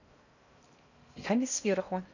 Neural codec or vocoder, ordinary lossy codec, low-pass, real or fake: codec, 16 kHz in and 24 kHz out, 0.6 kbps, FocalCodec, streaming, 2048 codes; none; 7.2 kHz; fake